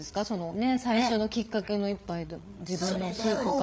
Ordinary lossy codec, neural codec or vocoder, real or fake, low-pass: none; codec, 16 kHz, 8 kbps, FreqCodec, larger model; fake; none